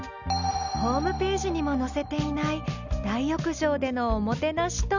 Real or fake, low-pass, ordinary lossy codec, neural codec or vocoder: real; 7.2 kHz; none; none